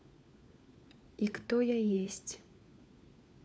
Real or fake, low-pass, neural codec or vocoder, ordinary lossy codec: fake; none; codec, 16 kHz, 4 kbps, FunCodec, trained on LibriTTS, 50 frames a second; none